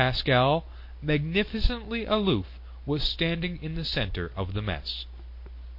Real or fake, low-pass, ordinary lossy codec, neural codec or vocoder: real; 5.4 kHz; MP3, 32 kbps; none